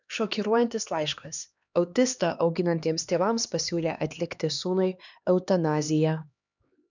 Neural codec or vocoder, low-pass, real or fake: codec, 16 kHz, 2 kbps, X-Codec, HuBERT features, trained on LibriSpeech; 7.2 kHz; fake